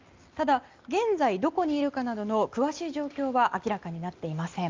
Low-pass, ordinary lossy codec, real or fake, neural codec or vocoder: 7.2 kHz; Opus, 24 kbps; real; none